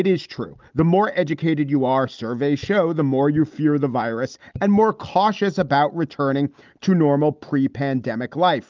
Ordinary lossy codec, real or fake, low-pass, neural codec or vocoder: Opus, 24 kbps; real; 7.2 kHz; none